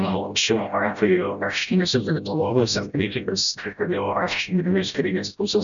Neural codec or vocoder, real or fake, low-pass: codec, 16 kHz, 0.5 kbps, FreqCodec, smaller model; fake; 7.2 kHz